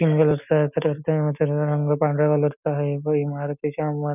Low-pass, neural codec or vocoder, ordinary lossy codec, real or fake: 3.6 kHz; none; none; real